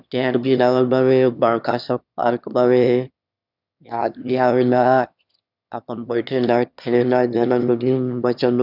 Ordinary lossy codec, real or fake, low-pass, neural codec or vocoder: none; fake; 5.4 kHz; autoencoder, 22.05 kHz, a latent of 192 numbers a frame, VITS, trained on one speaker